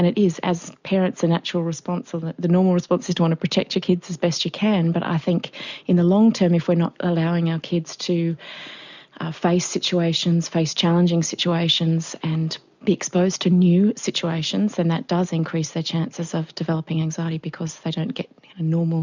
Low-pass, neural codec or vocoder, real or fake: 7.2 kHz; none; real